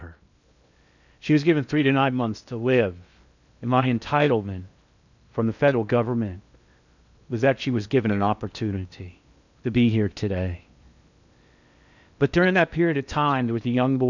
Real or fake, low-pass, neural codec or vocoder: fake; 7.2 kHz; codec, 16 kHz in and 24 kHz out, 0.8 kbps, FocalCodec, streaming, 65536 codes